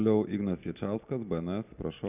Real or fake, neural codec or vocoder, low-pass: real; none; 3.6 kHz